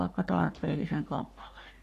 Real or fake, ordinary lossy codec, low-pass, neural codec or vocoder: fake; none; 14.4 kHz; codec, 44.1 kHz, 3.4 kbps, Pupu-Codec